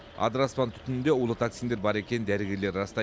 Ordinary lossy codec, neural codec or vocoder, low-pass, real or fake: none; none; none; real